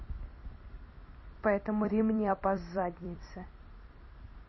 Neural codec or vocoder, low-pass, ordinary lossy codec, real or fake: vocoder, 44.1 kHz, 128 mel bands every 256 samples, BigVGAN v2; 7.2 kHz; MP3, 24 kbps; fake